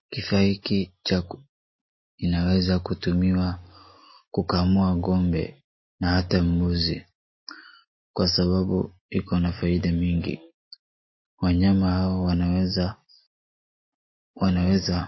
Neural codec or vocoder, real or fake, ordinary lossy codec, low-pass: none; real; MP3, 24 kbps; 7.2 kHz